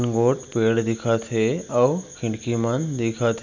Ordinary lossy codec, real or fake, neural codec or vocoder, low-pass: none; real; none; 7.2 kHz